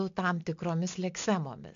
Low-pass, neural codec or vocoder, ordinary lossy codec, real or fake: 7.2 kHz; none; AAC, 64 kbps; real